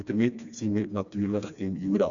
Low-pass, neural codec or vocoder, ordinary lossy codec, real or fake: 7.2 kHz; codec, 16 kHz, 2 kbps, FreqCodec, smaller model; none; fake